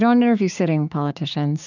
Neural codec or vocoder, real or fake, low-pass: codec, 16 kHz, 6 kbps, DAC; fake; 7.2 kHz